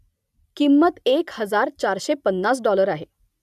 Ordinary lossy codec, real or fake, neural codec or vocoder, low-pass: none; real; none; 14.4 kHz